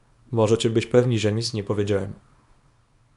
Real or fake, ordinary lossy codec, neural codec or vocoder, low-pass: fake; AAC, 96 kbps; codec, 24 kHz, 0.9 kbps, WavTokenizer, small release; 10.8 kHz